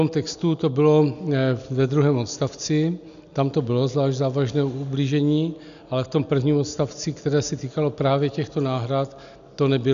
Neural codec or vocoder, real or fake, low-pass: none; real; 7.2 kHz